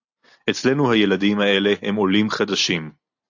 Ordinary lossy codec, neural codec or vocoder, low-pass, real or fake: AAC, 48 kbps; none; 7.2 kHz; real